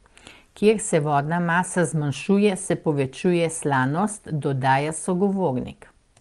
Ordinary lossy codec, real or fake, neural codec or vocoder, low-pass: Opus, 24 kbps; real; none; 10.8 kHz